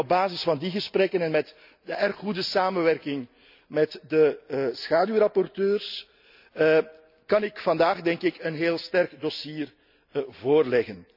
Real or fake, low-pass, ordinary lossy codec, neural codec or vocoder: real; 5.4 kHz; none; none